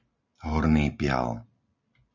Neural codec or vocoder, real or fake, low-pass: none; real; 7.2 kHz